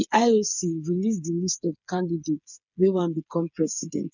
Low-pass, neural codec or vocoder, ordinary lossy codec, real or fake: 7.2 kHz; codec, 16 kHz, 8 kbps, FreqCodec, smaller model; none; fake